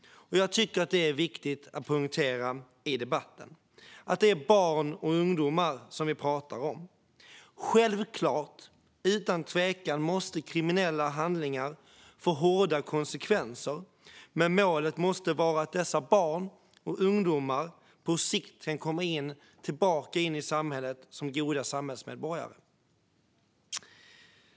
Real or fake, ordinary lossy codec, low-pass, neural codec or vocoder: real; none; none; none